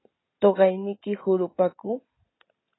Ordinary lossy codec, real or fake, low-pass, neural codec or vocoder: AAC, 16 kbps; real; 7.2 kHz; none